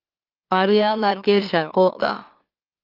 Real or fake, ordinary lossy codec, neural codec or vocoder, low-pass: fake; Opus, 32 kbps; autoencoder, 44.1 kHz, a latent of 192 numbers a frame, MeloTTS; 5.4 kHz